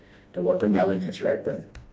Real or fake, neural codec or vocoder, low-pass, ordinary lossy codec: fake; codec, 16 kHz, 1 kbps, FreqCodec, smaller model; none; none